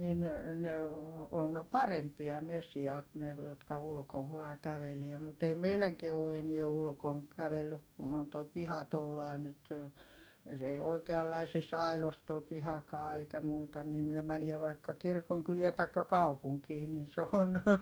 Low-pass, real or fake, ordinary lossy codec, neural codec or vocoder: none; fake; none; codec, 44.1 kHz, 2.6 kbps, DAC